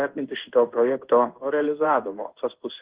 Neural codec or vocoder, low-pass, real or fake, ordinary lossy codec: codec, 16 kHz in and 24 kHz out, 1 kbps, XY-Tokenizer; 3.6 kHz; fake; Opus, 16 kbps